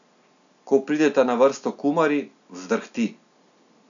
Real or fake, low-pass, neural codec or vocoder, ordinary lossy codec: real; 7.2 kHz; none; none